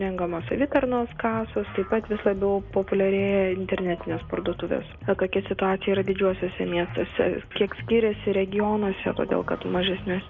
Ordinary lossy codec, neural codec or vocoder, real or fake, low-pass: Opus, 64 kbps; none; real; 7.2 kHz